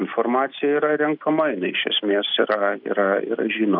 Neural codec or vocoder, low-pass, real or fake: none; 5.4 kHz; real